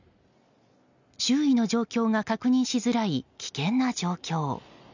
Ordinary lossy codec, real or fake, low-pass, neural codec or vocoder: none; real; 7.2 kHz; none